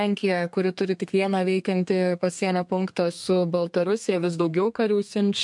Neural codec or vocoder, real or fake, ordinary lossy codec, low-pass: codec, 32 kHz, 1.9 kbps, SNAC; fake; MP3, 64 kbps; 10.8 kHz